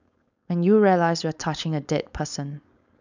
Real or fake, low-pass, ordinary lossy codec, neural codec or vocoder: fake; 7.2 kHz; none; codec, 16 kHz, 4.8 kbps, FACodec